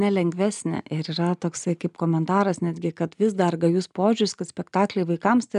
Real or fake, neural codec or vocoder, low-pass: real; none; 10.8 kHz